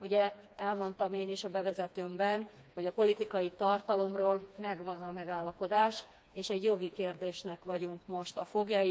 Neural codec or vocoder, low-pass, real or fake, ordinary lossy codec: codec, 16 kHz, 2 kbps, FreqCodec, smaller model; none; fake; none